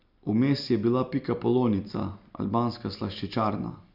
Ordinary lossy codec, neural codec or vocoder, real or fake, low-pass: none; none; real; 5.4 kHz